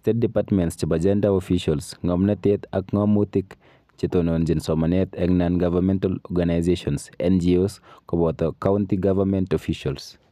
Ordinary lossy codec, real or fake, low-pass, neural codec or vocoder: none; real; 14.4 kHz; none